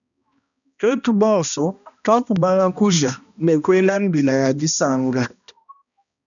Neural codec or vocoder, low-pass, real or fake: codec, 16 kHz, 1 kbps, X-Codec, HuBERT features, trained on balanced general audio; 7.2 kHz; fake